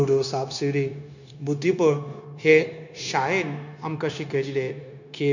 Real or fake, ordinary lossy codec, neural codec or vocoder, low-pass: fake; none; codec, 16 kHz, 0.9 kbps, LongCat-Audio-Codec; 7.2 kHz